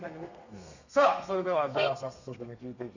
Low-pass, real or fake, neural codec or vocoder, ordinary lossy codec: 7.2 kHz; fake; codec, 32 kHz, 1.9 kbps, SNAC; none